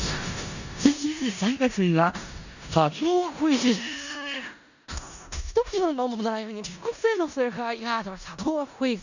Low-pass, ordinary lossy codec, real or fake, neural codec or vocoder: 7.2 kHz; none; fake; codec, 16 kHz in and 24 kHz out, 0.4 kbps, LongCat-Audio-Codec, four codebook decoder